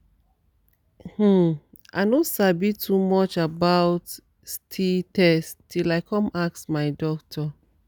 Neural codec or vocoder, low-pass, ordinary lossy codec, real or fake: none; none; none; real